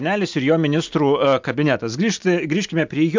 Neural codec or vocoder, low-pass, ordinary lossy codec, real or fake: none; 7.2 kHz; MP3, 64 kbps; real